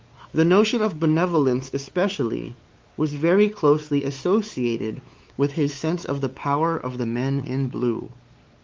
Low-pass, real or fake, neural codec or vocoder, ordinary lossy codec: 7.2 kHz; fake; codec, 16 kHz, 4 kbps, X-Codec, WavLM features, trained on Multilingual LibriSpeech; Opus, 32 kbps